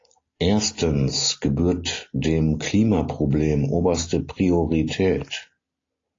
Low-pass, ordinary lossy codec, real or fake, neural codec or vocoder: 7.2 kHz; AAC, 32 kbps; real; none